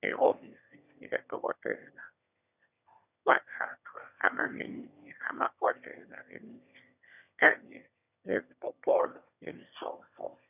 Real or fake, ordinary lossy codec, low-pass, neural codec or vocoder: fake; none; 3.6 kHz; autoencoder, 22.05 kHz, a latent of 192 numbers a frame, VITS, trained on one speaker